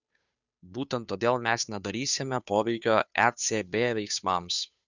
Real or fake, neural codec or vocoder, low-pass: fake; codec, 16 kHz, 2 kbps, FunCodec, trained on Chinese and English, 25 frames a second; 7.2 kHz